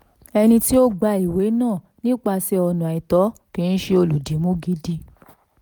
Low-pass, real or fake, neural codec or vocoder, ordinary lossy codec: none; real; none; none